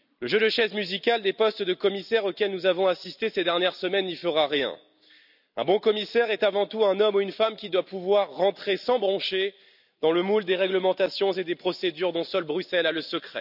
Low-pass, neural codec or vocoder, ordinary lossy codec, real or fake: 5.4 kHz; none; none; real